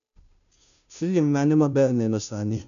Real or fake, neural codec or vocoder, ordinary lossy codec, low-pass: fake; codec, 16 kHz, 0.5 kbps, FunCodec, trained on Chinese and English, 25 frames a second; none; 7.2 kHz